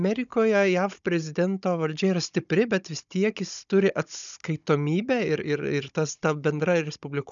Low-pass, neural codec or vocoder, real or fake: 7.2 kHz; codec, 16 kHz, 16 kbps, FunCodec, trained on Chinese and English, 50 frames a second; fake